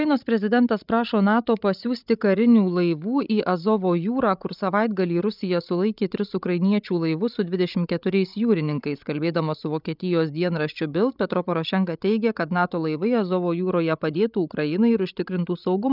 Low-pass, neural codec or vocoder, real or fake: 5.4 kHz; codec, 16 kHz, 16 kbps, FreqCodec, larger model; fake